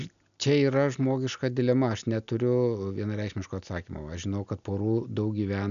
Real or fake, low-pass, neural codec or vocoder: real; 7.2 kHz; none